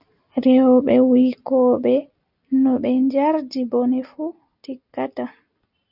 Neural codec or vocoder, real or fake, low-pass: none; real; 5.4 kHz